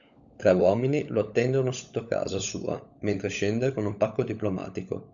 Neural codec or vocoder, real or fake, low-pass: codec, 16 kHz, 16 kbps, FunCodec, trained on LibriTTS, 50 frames a second; fake; 7.2 kHz